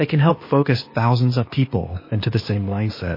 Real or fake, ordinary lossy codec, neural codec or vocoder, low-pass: fake; MP3, 24 kbps; codec, 16 kHz, 0.8 kbps, ZipCodec; 5.4 kHz